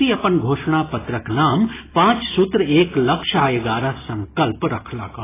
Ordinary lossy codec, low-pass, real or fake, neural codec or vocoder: AAC, 16 kbps; 3.6 kHz; real; none